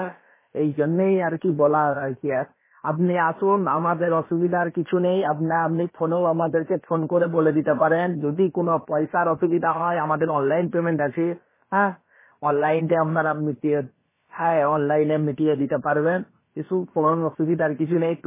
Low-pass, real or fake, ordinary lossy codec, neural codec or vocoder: 3.6 kHz; fake; MP3, 16 kbps; codec, 16 kHz, about 1 kbps, DyCAST, with the encoder's durations